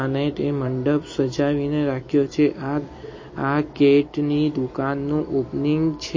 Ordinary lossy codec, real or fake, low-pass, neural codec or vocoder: MP3, 32 kbps; fake; 7.2 kHz; vocoder, 44.1 kHz, 128 mel bands every 256 samples, BigVGAN v2